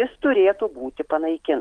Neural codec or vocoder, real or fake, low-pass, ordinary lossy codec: none; real; 9.9 kHz; Opus, 16 kbps